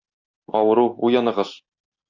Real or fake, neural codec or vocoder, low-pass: real; none; 7.2 kHz